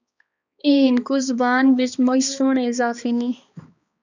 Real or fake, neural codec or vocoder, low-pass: fake; codec, 16 kHz, 2 kbps, X-Codec, HuBERT features, trained on balanced general audio; 7.2 kHz